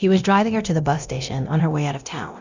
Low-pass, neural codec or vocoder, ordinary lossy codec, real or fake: 7.2 kHz; codec, 24 kHz, 0.9 kbps, DualCodec; Opus, 64 kbps; fake